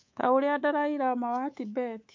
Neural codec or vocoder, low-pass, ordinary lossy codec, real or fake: codec, 24 kHz, 3.1 kbps, DualCodec; 7.2 kHz; MP3, 48 kbps; fake